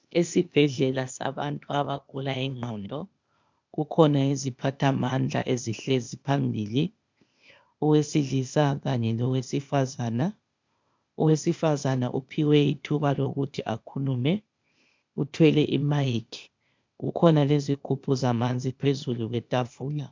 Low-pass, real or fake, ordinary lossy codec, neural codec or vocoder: 7.2 kHz; fake; MP3, 64 kbps; codec, 16 kHz, 0.8 kbps, ZipCodec